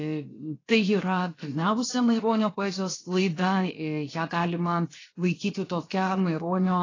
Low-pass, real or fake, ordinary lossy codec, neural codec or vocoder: 7.2 kHz; fake; AAC, 32 kbps; codec, 16 kHz, 0.7 kbps, FocalCodec